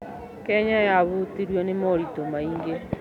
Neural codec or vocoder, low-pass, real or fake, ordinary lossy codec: none; 19.8 kHz; real; none